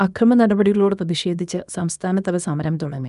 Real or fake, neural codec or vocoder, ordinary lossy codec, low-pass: fake; codec, 24 kHz, 0.9 kbps, WavTokenizer, small release; none; 10.8 kHz